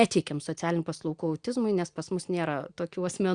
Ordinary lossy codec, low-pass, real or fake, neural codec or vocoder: Opus, 64 kbps; 9.9 kHz; real; none